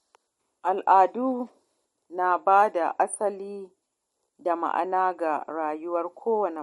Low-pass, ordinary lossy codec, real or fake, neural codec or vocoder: 19.8 kHz; MP3, 48 kbps; real; none